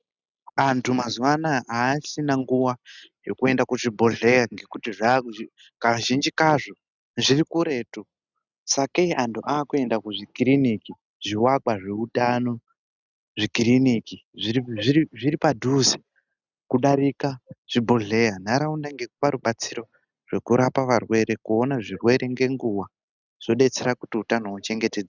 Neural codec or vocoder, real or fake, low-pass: none; real; 7.2 kHz